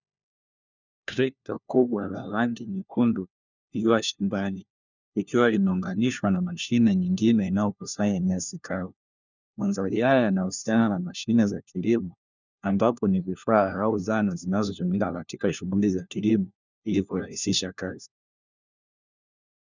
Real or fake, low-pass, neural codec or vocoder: fake; 7.2 kHz; codec, 16 kHz, 1 kbps, FunCodec, trained on LibriTTS, 50 frames a second